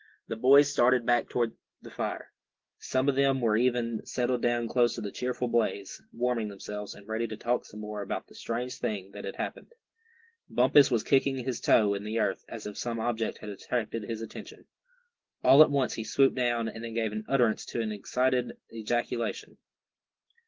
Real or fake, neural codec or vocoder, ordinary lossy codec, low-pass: real; none; Opus, 16 kbps; 7.2 kHz